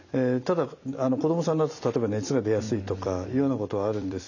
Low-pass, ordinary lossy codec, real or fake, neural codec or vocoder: 7.2 kHz; none; fake; vocoder, 44.1 kHz, 128 mel bands every 256 samples, BigVGAN v2